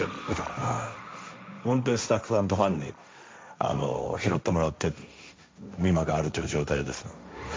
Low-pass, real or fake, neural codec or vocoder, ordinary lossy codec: none; fake; codec, 16 kHz, 1.1 kbps, Voila-Tokenizer; none